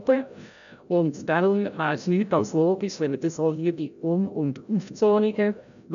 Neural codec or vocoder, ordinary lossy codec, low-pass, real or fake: codec, 16 kHz, 0.5 kbps, FreqCodec, larger model; none; 7.2 kHz; fake